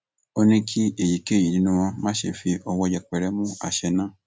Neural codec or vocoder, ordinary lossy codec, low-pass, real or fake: none; none; none; real